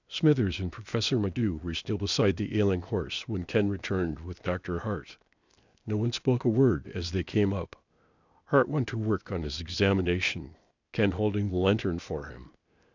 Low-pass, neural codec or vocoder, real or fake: 7.2 kHz; codec, 16 kHz, 0.8 kbps, ZipCodec; fake